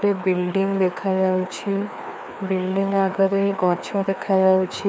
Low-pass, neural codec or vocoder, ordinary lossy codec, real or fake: none; codec, 16 kHz, 2 kbps, FreqCodec, larger model; none; fake